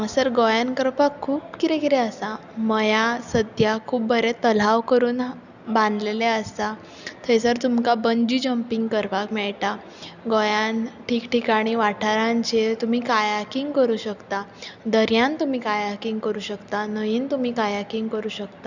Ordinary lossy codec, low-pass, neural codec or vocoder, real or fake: none; 7.2 kHz; none; real